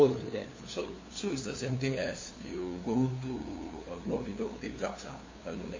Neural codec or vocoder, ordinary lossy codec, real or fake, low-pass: codec, 16 kHz, 2 kbps, FunCodec, trained on LibriTTS, 25 frames a second; MP3, 32 kbps; fake; 7.2 kHz